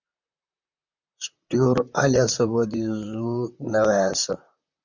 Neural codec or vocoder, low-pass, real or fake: vocoder, 44.1 kHz, 128 mel bands, Pupu-Vocoder; 7.2 kHz; fake